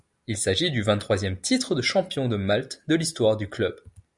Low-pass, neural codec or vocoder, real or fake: 10.8 kHz; none; real